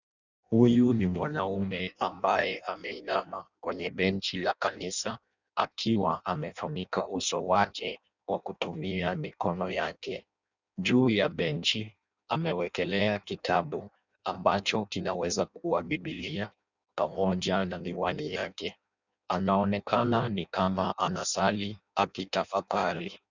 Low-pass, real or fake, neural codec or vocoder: 7.2 kHz; fake; codec, 16 kHz in and 24 kHz out, 0.6 kbps, FireRedTTS-2 codec